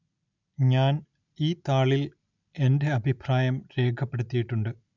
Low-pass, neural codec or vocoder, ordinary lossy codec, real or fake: 7.2 kHz; none; none; real